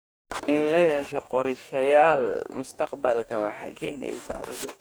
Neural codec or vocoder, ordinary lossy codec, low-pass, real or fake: codec, 44.1 kHz, 2.6 kbps, DAC; none; none; fake